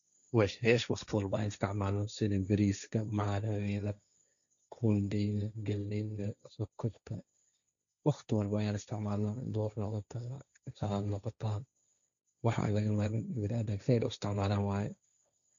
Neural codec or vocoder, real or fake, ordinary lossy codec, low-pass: codec, 16 kHz, 1.1 kbps, Voila-Tokenizer; fake; none; 7.2 kHz